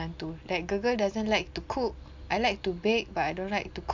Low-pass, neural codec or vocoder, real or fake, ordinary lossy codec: 7.2 kHz; none; real; MP3, 64 kbps